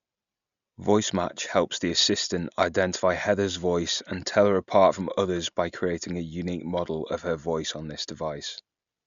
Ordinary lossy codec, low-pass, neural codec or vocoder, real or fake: Opus, 64 kbps; 7.2 kHz; none; real